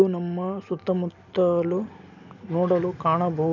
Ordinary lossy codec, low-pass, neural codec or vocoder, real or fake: none; 7.2 kHz; none; real